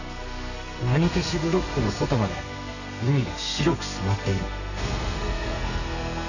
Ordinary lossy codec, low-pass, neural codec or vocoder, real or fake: none; 7.2 kHz; codec, 32 kHz, 1.9 kbps, SNAC; fake